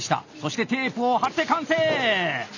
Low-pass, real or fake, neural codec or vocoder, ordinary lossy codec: 7.2 kHz; real; none; none